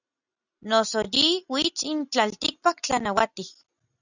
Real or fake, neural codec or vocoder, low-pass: real; none; 7.2 kHz